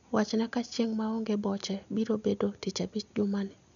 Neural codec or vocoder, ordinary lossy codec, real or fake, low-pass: none; none; real; 7.2 kHz